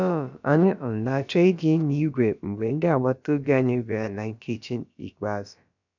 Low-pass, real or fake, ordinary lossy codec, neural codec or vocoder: 7.2 kHz; fake; none; codec, 16 kHz, about 1 kbps, DyCAST, with the encoder's durations